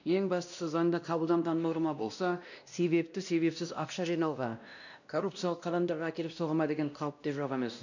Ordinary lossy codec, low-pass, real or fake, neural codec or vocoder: none; 7.2 kHz; fake; codec, 16 kHz, 1 kbps, X-Codec, WavLM features, trained on Multilingual LibriSpeech